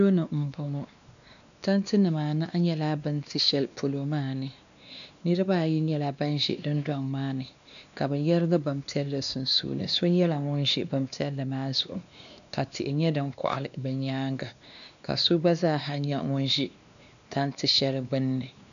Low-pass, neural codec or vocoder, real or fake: 7.2 kHz; codec, 16 kHz, 2 kbps, X-Codec, WavLM features, trained on Multilingual LibriSpeech; fake